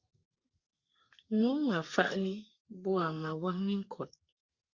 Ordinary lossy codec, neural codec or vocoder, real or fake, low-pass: Opus, 64 kbps; codec, 32 kHz, 1.9 kbps, SNAC; fake; 7.2 kHz